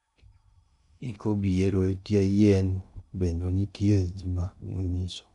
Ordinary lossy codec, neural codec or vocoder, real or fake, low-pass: none; codec, 16 kHz in and 24 kHz out, 0.6 kbps, FocalCodec, streaming, 2048 codes; fake; 10.8 kHz